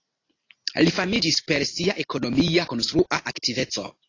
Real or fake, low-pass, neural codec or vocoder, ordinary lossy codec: real; 7.2 kHz; none; AAC, 32 kbps